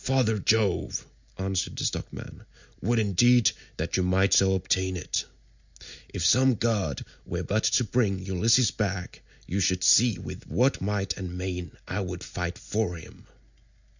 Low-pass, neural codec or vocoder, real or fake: 7.2 kHz; none; real